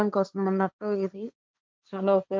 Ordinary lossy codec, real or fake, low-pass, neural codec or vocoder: none; fake; none; codec, 16 kHz, 1.1 kbps, Voila-Tokenizer